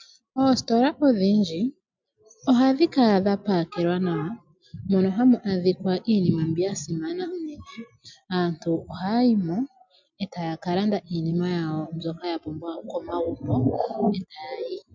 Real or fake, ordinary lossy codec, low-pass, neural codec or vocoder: real; MP3, 64 kbps; 7.2 kHz; none